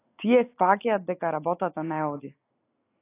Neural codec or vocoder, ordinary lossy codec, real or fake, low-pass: none; AAC, 16 kbps; real; 3.6 kHz